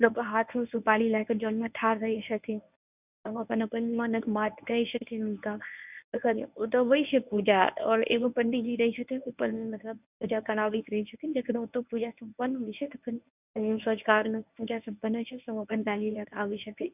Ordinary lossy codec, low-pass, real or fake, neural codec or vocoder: none; 3.6 kHz; fake; codec, 24 kHz, 0.9 kbps, WavTokenizer, medium speech release version 1